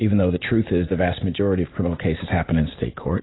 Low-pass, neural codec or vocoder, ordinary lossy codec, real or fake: 7.2 kHz; none; AAC, 16 kbps; real